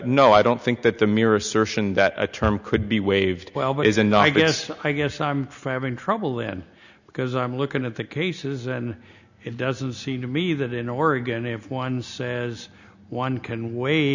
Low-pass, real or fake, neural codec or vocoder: 7.2 kHz; real; none